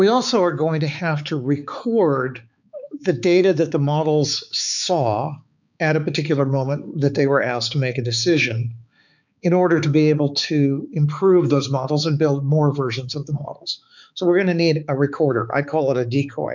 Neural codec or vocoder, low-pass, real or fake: codec, 16 kHz, 4 kbps, X-Codec, HuBERT features, trained on balanced general audio; 7.2 kHz; fake